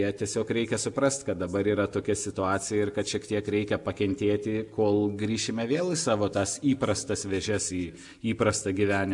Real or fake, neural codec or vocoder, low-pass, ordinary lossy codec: real; none; 10.8 kHz; MP3, 96 kbps